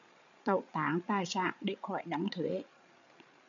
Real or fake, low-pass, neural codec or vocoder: fake; 7.2 kHz; codec, 16 kHz, 8 kbps, FreqCodec, larger model